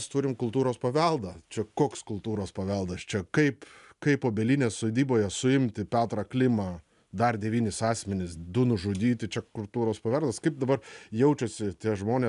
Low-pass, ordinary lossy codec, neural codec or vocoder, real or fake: 10.8 kHz; MP3, 96 kbps; none; real